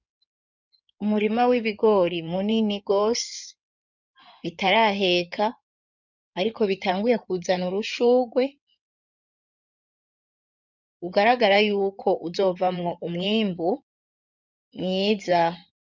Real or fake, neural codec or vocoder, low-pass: fake; codec, 16 kHz in and 24 kHz out, 2.2 kbps, FireRedTTS-2 codec; 7.2 kHz